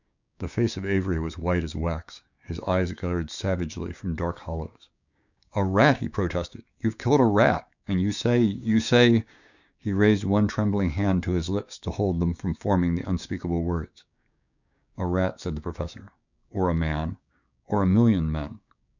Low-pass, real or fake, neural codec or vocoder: 7.2 kHz; fake; codec, 16 kHz, 6 kbps, DAC